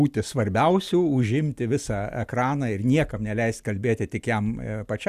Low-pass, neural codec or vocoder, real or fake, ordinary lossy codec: 14.4 kHz; none; real; Opus, 64 kbps